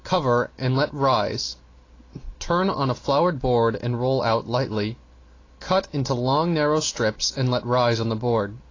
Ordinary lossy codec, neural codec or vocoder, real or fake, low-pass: AAC, 32 kbps; none; real; 7.2 kHz